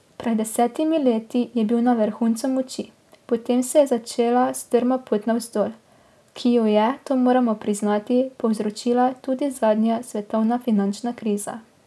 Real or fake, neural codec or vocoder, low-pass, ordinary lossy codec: real; none; none; none